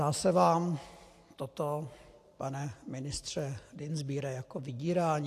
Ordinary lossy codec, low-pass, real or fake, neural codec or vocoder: AAC, 64 kbps; 14.4 kHz; real; none